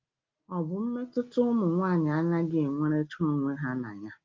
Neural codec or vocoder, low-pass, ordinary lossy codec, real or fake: none; 7.2 kHz; Opus, 24 kbps; real